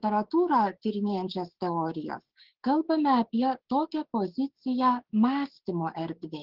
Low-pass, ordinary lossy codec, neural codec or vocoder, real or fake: 5.4 kHz; Opus, 16 kbps; codec, 16 kHz, 6 kbps, DAC; fake